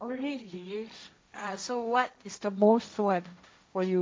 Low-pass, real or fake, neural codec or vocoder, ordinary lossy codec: none; fake; codec, 16 kHz, 1.1 kbps, Voila-Tokenizer; none